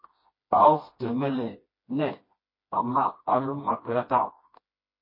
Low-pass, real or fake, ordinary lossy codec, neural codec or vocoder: 5.4 kHz; fake; MP3, 24 kbps; codec, 16 kHz, 1 kbps, FreqCodec, smaller model